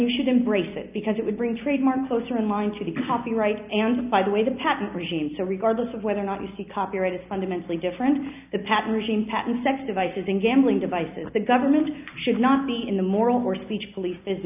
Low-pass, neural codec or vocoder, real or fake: 3.6 kHz; none; real